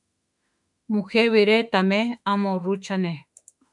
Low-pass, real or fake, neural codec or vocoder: 10.8 kHz; fake; autoencoder, 48 kHz, 32 numbers a frame, DAC-VAE, trained on Japanese speech